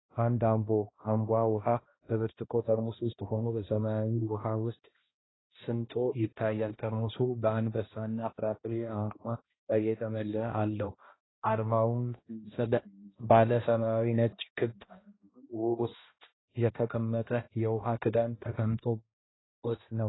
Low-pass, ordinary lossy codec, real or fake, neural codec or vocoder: 7.2 kHz; AAC, 16 kbps; fake; codec, 16 kHz, 1 kbps, X-Codec, HuBERT features, trained on balanced general audio